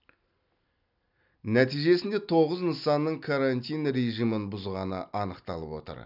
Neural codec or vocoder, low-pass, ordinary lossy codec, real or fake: none; 5.4 kHz; none; real